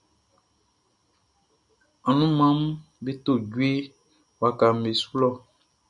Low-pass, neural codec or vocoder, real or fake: 10.8 kHz; none; real